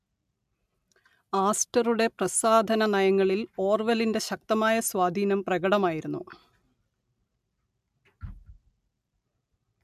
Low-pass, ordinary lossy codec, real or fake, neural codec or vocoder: 14.4 kHz; MP3, 96 kbps; real; none